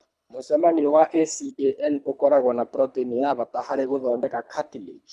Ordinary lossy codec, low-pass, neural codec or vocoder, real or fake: none; none; codec, 24 kHz, 3 kbps, HILCodec; fake